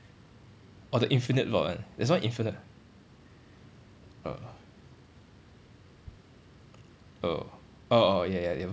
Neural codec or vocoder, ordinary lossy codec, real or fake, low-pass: none; none; real; none